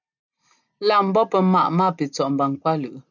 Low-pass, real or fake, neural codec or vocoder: 7.2 kHz; real; none